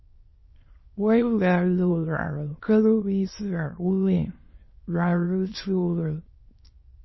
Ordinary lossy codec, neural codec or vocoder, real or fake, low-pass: MP3, 24 kbps; autoencoder, 22.05 kHz, a latent of 192 numbers a frame, VITS, trained on many speakers; fake; 7.2 kHz